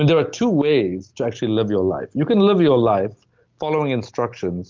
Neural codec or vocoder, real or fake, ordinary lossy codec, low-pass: none; real; Opus, 24 kbps; 7.2 kHz